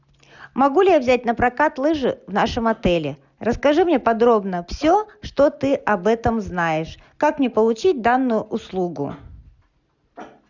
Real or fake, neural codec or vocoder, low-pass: real; none; 7.2 kHz